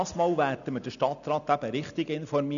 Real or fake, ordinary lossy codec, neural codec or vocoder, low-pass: real; none; none; 7.2 kHz